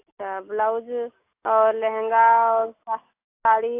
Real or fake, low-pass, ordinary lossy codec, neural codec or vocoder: real; 3.6 kHz; AAC, 24 kbps; none